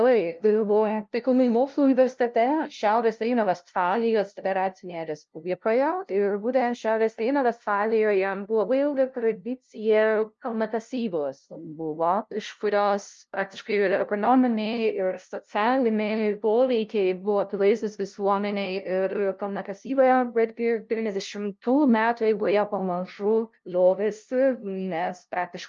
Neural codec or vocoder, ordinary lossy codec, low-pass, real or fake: codec, 16 kHz, 0.5 kbps, FunCodec, trained on LibriTTS, 25 frames a second; Opus, 24 kbps; 7.2 kHz; fake